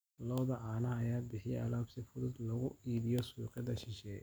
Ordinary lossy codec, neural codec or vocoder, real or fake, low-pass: none; none; real; none